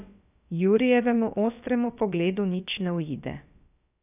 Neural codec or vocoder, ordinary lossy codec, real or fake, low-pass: codec, 16 kHz, about 1 kbps, DyCAST, with the encoder's durations; none; fake; 3.6 kHz